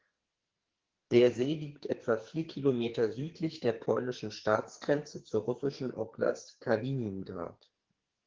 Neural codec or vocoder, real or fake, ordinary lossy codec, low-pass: codec, 44.1 kHz, 2.6 kbps, SNAC; fake; Opus, 16 kbps; 7.2 kHz